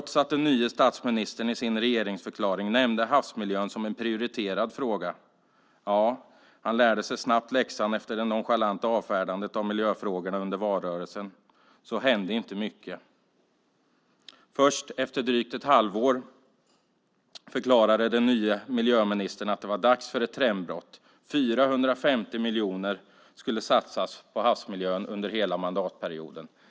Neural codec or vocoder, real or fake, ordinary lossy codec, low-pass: none; real; none; none